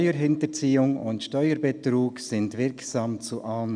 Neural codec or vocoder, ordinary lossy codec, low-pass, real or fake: none; none; 9.9 kHz; real